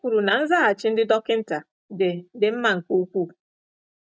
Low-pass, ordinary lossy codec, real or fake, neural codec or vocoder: none; none; real; none